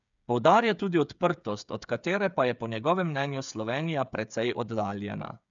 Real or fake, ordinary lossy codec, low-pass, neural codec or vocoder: fake; none; 7.2 kHz; codec, 16 kHz, 8 kbps, FreqCodec, smaller model